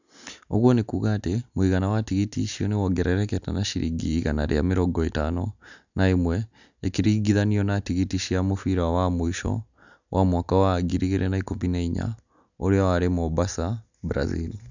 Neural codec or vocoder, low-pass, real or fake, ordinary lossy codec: none; 7.2 kHz; real; none